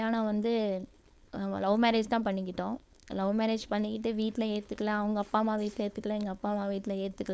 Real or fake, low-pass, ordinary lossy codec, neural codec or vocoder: fake; none; none; codec, 16 kHz, 4.8 kbps, FACodec